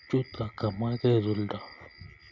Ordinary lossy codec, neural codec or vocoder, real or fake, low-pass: none; none; real; 7.2 kHz